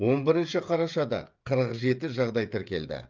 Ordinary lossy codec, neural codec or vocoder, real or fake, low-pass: Opus, 24 kbps; codec, 16 kHz, 16 kbps, FreqCodec, smaller model; fake; 7.2 kHz